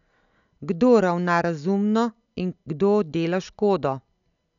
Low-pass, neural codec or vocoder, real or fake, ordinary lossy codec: 7.2 kHz; none; real; none